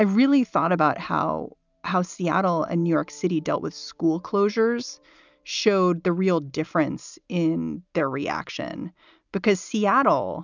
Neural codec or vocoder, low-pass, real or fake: none; 7.2 kHz; real